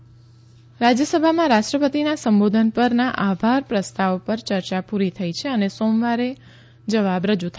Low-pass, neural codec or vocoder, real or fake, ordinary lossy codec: none; none; real; none